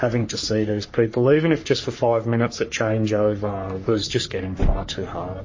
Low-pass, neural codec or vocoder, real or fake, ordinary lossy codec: 7.2 kHz; codec, 44.1 kHz, 3.4 kbps, Pupu-Codec; fake; MP3, 32 kbps